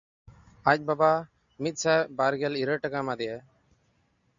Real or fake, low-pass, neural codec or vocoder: real; 7.2 kHz; none